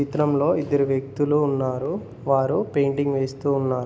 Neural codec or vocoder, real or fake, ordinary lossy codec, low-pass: none; real; none; none